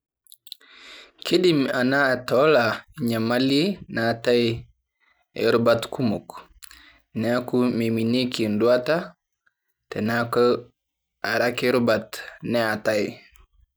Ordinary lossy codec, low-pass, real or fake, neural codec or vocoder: none; none; real; none